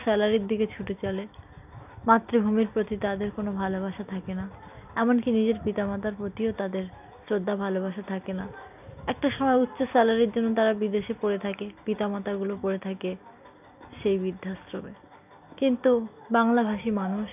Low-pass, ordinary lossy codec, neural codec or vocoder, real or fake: 3.6 kHz; none; none; real